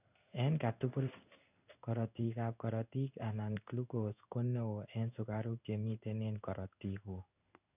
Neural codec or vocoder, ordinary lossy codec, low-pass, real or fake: codec, 16 kHz in and 24 kHz out, 1 kbps, XY-Tokenizer; none; 3.6 kHz; fake